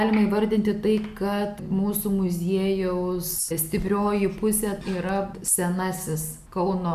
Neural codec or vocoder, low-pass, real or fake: none; 14.4 kHz; real